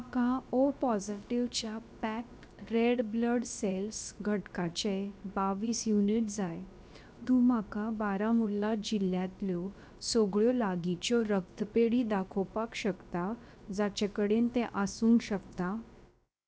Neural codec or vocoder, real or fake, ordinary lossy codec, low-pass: codec, 16 kHz, about 1 kbps, DyCAST, with the encoder's durations; fake; none; none